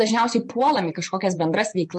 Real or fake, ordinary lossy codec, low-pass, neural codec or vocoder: fake; MP3, 48 kbps; 10.8 kHz; vocoder, 24 kHz, 100 mel bands, Vocos